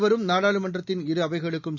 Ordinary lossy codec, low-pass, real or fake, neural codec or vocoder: none; 7.2 kHz; real; none